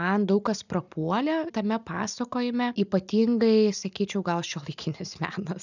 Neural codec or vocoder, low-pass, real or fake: none; 7.2 kHz; real